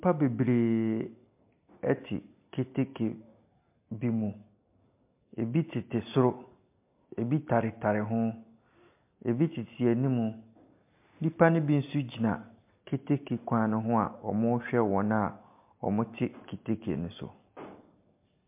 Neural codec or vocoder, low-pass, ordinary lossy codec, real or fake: none; 3.6 kHz; MP3, 32 kbps; real